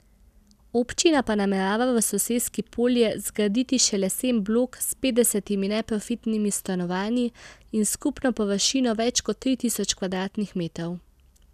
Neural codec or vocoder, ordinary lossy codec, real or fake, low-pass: none; none; real; 14.4 kHz